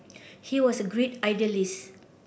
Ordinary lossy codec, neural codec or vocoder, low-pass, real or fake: none; none; none; real